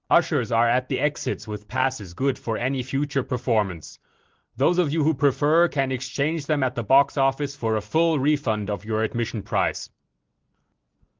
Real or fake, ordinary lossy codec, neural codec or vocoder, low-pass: real; Opus, 16 kbps; none; 7.2 kHz